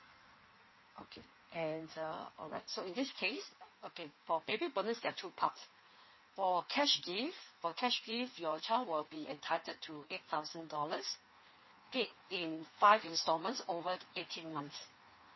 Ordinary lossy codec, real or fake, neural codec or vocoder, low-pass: MP3, 24 kbps; fake; codec, 16 kHz in and 24 kHz out, 1.1 kbps, FireRedTTS-2 codec; 7.2 kHz